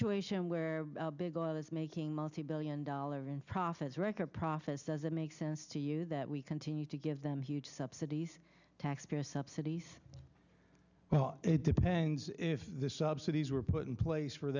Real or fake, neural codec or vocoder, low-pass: real; none; 7.2 kHz